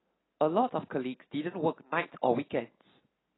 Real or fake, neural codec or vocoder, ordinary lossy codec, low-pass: real; none; AAC, 16 kbps; 7.2 kHz